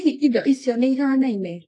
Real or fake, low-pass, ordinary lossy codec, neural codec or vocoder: fake; 10.8 kHz; AAC, 48 kbps; codec, 24 kHz, 0.9 kbps, WavTokenizer, medium music audio release